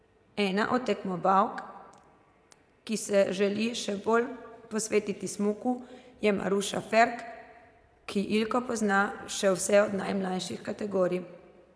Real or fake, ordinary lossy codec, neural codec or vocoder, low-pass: fake; none; vocoder, 22.05 kHz, 80 mel bands, Vocos; none